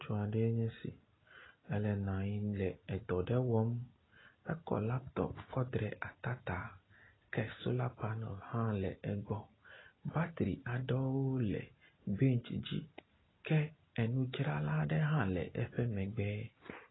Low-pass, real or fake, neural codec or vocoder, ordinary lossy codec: 7.2 kHz; real; none; AAC, 16 kbps